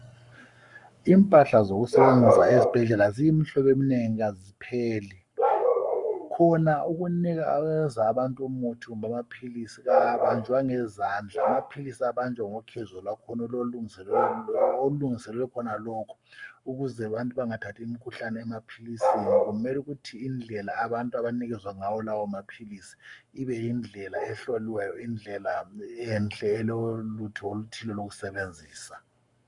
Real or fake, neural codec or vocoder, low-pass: fake; codec, 44.1 kHz, 7.8 kbps, Pupu-Codec; 10.8 kHz